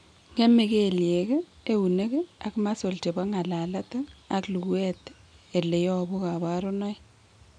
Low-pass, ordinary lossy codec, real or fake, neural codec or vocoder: 9.9 kHz; none; real; none